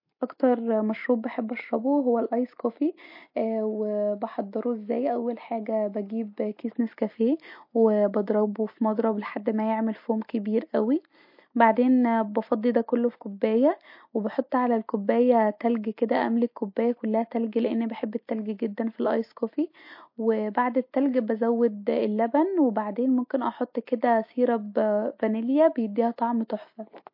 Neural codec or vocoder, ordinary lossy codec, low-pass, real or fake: none; MP3, 32 kbps; 5.4 kHz; real